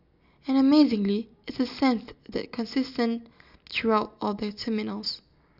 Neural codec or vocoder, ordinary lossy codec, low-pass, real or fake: none; none; 5.4 kHz; real